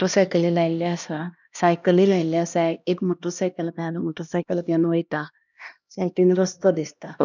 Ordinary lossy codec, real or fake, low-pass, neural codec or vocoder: none; fake; 7.2 kHz; codec, 16 kHz, 1 kbps, X-Codec, HuBERT features, trained on LibriSpeech